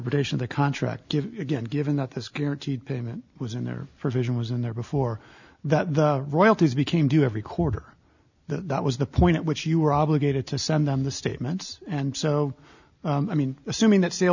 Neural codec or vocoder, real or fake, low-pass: none; real; 7.2 kHz